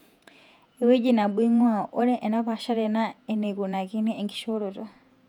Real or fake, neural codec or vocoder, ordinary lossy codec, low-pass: fake; vocoder, 48 kHz, 128 mel bands, Vocos; none; 19.8 kHz